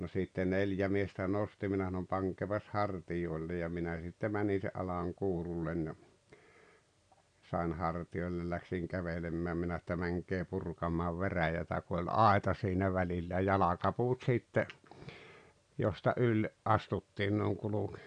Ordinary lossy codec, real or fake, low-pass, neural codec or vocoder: none; real; 9.9 kHz; none